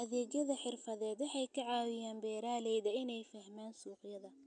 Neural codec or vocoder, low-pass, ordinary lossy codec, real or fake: none; 9.9 kHz; none; real